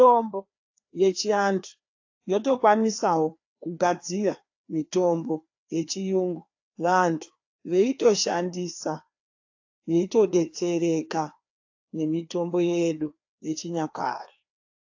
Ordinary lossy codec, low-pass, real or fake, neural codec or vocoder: AAC, 48 kbps; 7.2 kHz; fake; codec, 16 kHz, 2 kbps, FreqCodec, larger model